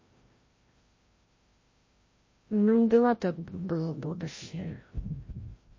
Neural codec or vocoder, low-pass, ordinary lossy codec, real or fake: codec, 16 kHz, 0.5 kbps, FreqCodec, larger model; 7.2 kHz; MP3, 32 kbps; fake